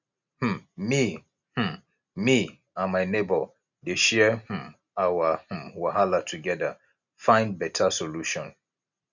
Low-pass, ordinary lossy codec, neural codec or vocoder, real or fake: 7.2 kHz; none; none; real